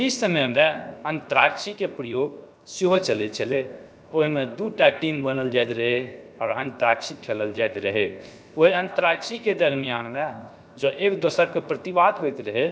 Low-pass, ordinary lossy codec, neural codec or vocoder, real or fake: none; none; codec, 16 kHz, 0.7 kbps, FocalCodec; fake